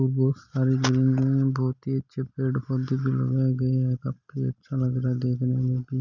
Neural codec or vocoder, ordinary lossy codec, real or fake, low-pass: none; none; real; 7.2 kHz